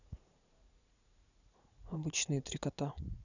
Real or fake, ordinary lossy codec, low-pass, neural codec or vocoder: fake; none; 7.2 kHz; vocoder, 44.1 kHz, 80 mel bands, Vocos